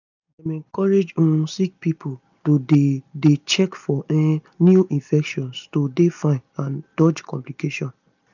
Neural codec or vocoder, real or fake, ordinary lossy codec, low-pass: none; real; none; 7.2 kHz